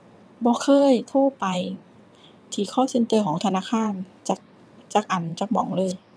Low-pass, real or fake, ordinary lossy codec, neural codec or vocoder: none; fake; none; vocoder, 22.05 kHz, 80 mel bands, WaveNeXt